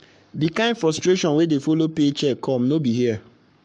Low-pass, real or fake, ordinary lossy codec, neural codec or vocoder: 10.8 kHz; fake; MP3, 64 kbps; codec, 44.1 kHz, 7.8 kbps, Pupu-Codec